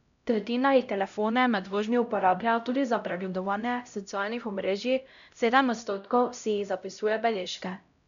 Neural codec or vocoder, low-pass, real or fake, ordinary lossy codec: codec, 16 kHz, 0.5 kbps, X-Codec, HuBERT features, trained on LibriSpeech; 7.2 kHz; fake; none